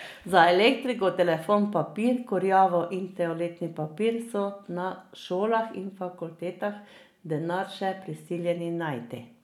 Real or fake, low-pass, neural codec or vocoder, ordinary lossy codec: real; 19.8 kHz; none; none